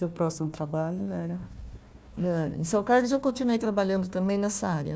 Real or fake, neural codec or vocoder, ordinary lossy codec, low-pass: fake; codec, 16 kHz, 1 kbps, FunCodec, trained on Chinese and English, 50 frames a second; none; none